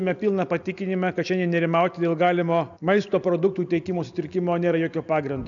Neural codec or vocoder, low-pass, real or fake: none; 7.2 kHz; real